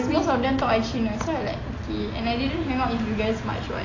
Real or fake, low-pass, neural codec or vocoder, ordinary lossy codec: real; 7.2 kHz; none; AAC, 32 kbps